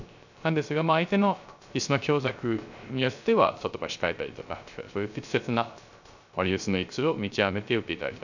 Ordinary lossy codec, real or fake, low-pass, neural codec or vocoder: none; fake; 7.2 kHz; codec, 16 kHz, 0.3 kbps, FocalCodec